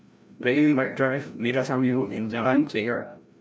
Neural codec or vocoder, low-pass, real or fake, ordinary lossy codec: codec, 16 kHz, 0.5 kbps, FreqCodec, larger model; none; fake; none